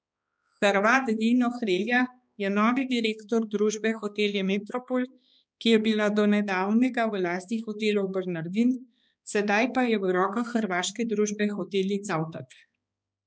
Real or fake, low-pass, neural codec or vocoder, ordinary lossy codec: fake; none; codec, 16 kHz, 2 kbps, X-Codec, HuBERT features, trained on balanced general audio; none